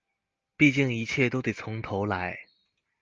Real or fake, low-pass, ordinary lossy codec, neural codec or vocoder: real; 7.2 kHz; Opus, 24 kbps; none